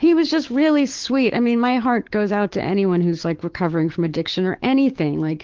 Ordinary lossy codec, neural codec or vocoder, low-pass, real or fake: Opus, 16 kbps; codec, 16 kHz, 6 kbps, DAC; 7.2 kHz; fake